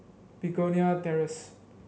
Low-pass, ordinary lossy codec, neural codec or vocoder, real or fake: none; none; none; real